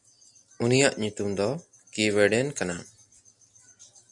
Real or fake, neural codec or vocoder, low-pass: real; none; 10.8 kHz